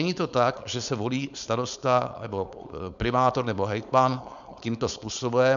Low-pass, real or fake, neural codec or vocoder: 7.2 kHz; fake; codec, 16 kHz, 4.8 kbps, FACodec